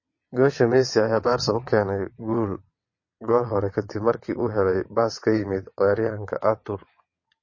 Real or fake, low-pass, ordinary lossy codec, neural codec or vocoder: fake; 7.2 kHz; MP3, 32 kbps; vocoder, 22.05 kHz, 80 mel bands, WaveNeXt